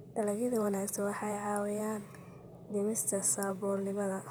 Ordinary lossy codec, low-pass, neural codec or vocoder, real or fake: none; none; vocoder, 44.1 kHz, 128 mel bands, Pupu-Vocoder; fake